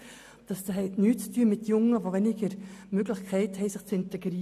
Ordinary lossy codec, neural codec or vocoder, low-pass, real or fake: none; none; 14.4 kHz; real